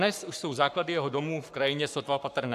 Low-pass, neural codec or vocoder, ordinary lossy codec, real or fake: 14.4 kHz; codec, 44.1 kHz, 7.8 kbps, Pupu-Codec; AAC, 96 kbps; fake